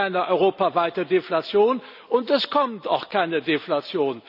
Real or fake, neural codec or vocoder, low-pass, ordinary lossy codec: real; none; 5.4 kHz; none